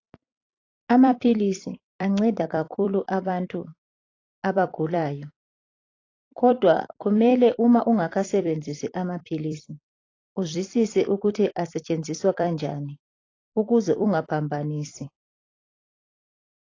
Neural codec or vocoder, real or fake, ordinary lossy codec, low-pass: vocoder, 44.1 kHz, 128 mel bands every 256 samples, BigVGAN v2; fake; AAC, 32 kbps; 7.2 kHz